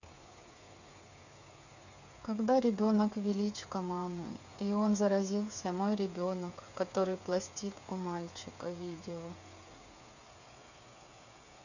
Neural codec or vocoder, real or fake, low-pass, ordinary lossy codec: codec, 16 kHz, 8 kbps, FreqCodec, smaller model; fake; 7.2 kHz; none